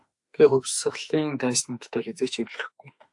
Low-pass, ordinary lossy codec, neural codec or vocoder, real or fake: 10.8 kHz; AAC, 64 kbps; autoencoder, 48 kHz, 32 numbers a frame, DAC-VAE, trained on Japanese speech; fake